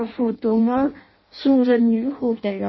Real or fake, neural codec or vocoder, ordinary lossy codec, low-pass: fake; codec, 16 kHz in and 24 kHz out, 0.6 kbps, FireRedTTS-2 codec; MP3, 24 kbps; 7.2 kHz